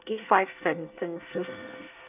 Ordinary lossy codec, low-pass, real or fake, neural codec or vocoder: none; 3.6 kHz; fake; codec, 24 kHz, 1 kbps, SNAC